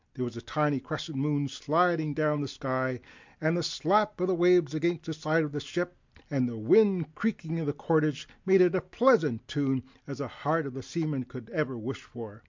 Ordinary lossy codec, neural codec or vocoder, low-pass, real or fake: MP3, 64 kbps; none; 7.2 kHz; real